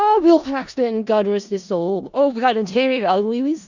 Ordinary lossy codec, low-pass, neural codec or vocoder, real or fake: Opus, 64 kbps; 7.2 kHz; codec, 16 kHz in and 24 kHz out, 0.4 kbps, LongCat-Audio-Codec, four codebook decoder; fake